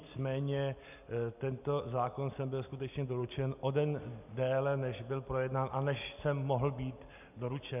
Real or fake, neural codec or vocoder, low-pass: real; none; 3.6 kHz